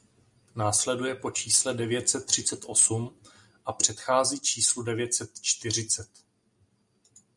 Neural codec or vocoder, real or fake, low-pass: none; real; 10.8 kHz